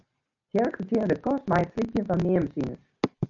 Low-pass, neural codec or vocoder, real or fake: 7.2 kHz; none; real